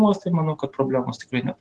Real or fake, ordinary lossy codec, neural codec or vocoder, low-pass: real; Opus, 16 kbps; none; 10.8 kHz